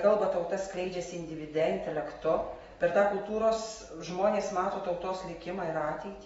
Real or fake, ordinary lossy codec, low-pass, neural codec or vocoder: real; AAC, 24 kbps; 19.8 kHz; none